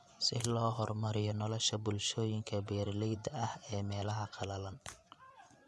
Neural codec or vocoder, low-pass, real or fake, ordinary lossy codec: vocoder, 24 kHz, 100 mel bands, Vocos; none; fake; none